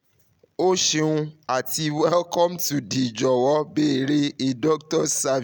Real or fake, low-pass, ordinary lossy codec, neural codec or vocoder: real; none; none; none